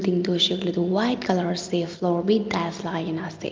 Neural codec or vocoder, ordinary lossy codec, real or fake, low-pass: none; Opus, 32 kbps; real; 7.2 kHz